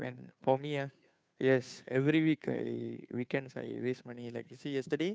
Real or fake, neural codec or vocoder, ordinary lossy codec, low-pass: fake; codec, 16 kHz, 2 kbps, FunCodec, trained on Chinese and English, 25 frames a second; none; none